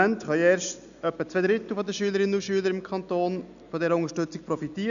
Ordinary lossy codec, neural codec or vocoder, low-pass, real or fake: none; none; 7.2 kHz; real